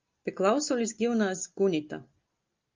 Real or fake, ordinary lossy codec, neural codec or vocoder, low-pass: real; Opus, 24 kbps; none; 7.2 kHz